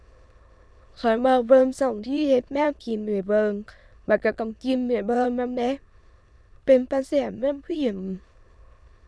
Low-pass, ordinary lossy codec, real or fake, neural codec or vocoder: none; none; fake; autoencoder, 22.05 kHz, a latent of 192 numbers a frame, VITS, trained on many speakers